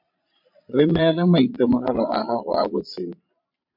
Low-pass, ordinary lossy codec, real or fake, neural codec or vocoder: 5.4 kHz; MP3, 48 kbps; fake; vocoder, 22.05 kHz, 80 mel bands, Vocos